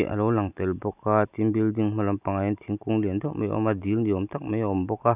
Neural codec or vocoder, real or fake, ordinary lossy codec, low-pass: none; real; none; 3.6 kHz